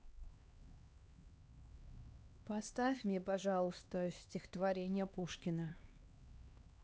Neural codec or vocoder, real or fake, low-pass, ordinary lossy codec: codec, 16 kHz, 2 kbps, X-Codec, HuBERT features, trained on LibriSpeech; fake; none; none